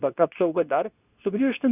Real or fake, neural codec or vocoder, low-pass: fake; codec, 24 kHz, 0.9 kbps, WavTokenizer, medium speech release version 2; 3.6 kHz